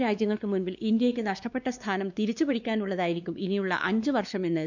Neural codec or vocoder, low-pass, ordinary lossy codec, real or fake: codec, 16 kHz, 2 kbps, X-Codec, WavLM features, trained on Multilingual LibriSpeech; 7.2 kHz; none; fake